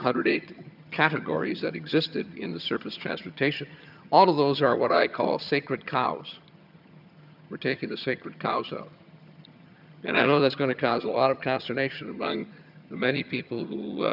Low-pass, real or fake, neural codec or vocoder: 5.4 kHz; fake; vocoder, 22.05 kHz, 80 mel bands, HiFi-GAN